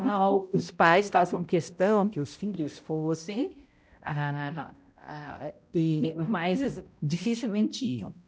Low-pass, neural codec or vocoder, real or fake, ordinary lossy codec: none; codec, 16 kHz, 0.5 kbps, X-Codec, HuBERT features, trained on balanced general audio; fake; none